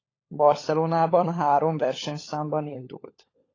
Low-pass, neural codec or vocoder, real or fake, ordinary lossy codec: 7.2 kHz; codec, 16 kHz, 16 kbps, FunCodec, trained on LibriTTS, 50 frames a second; fake; AAC, 32 kbps